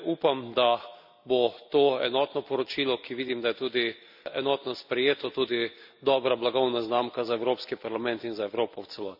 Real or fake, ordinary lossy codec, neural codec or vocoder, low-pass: real; none; none; 5.4 kHz